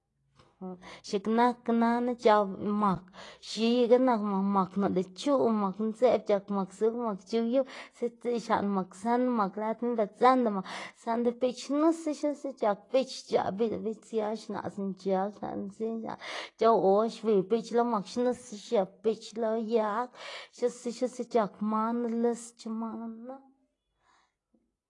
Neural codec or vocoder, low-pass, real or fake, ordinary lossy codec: none; 10.8 kHz; real; AAC, 32 kbps